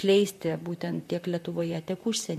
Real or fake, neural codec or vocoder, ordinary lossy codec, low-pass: fake; vocoder, 44.1 kHz, 128 mel bands, Pupu-Vocoder; MP3, 64 kbps; 14.4 kHz